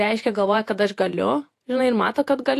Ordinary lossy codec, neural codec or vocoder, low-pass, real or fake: AAC, 64 kbps; vocoder, 48 kHz, 128 mel bands, Vocos; 14.4 kHz; fake